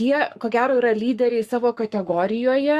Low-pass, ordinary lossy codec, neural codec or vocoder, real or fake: 14.4 kHz; Opus, 64 kbps; codec, 44.1 kHz, 7.8 kbps, Pupu-Codec; fake